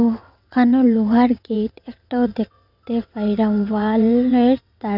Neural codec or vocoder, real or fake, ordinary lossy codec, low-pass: vocoder, 22.05 kHz, 80 mel bands, WaveNeXt; fake; none; 5.4 kHz